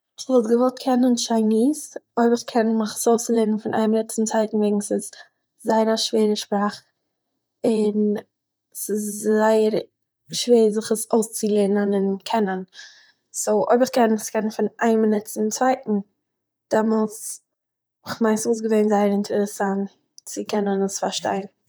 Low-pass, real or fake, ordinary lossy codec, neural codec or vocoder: none; fake; none; vocoder, 44.1 kHz, 128 mel bands, Pupu-Vocoder